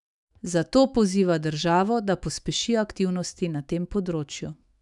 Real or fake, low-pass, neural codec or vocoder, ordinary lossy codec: fake; 10.8 kHz; autoencoder, 48 kHz, 128 numbers a frame, DAC-VAE, trained on Japanese speech; none